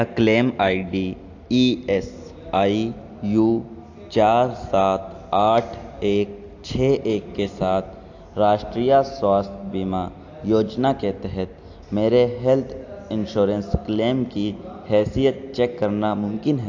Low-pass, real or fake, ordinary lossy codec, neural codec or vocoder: 7.2 kHz; real; AAC, 48 kbps; none